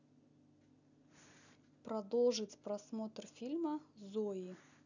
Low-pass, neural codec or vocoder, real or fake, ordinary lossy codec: 7.2 kHz; none; real; none